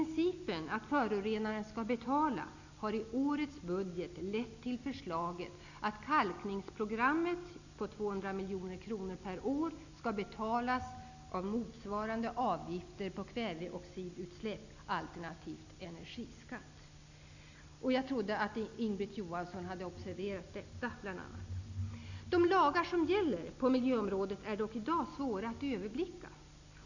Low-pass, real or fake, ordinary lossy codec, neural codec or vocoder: 7.2 kHz; real; none; none